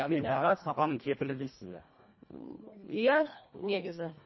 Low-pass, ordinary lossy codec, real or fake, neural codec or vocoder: 7.2 kHz; MP3, 24 kbps; fake; codec, 24 kHz, 1.5 kbps, HILCodec